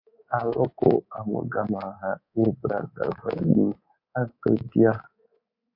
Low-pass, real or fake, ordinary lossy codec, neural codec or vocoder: 5.4 kHz; fake; MP3, 32 kbps; codec, 24 kHz, 3.1 kbps, DualCodec